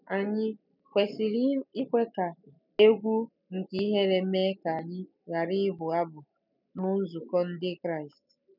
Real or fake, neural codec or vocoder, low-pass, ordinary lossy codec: real; none; 5.4 kHz; none